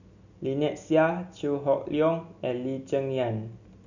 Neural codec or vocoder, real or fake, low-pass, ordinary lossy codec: none; real; 7.2 kHz; none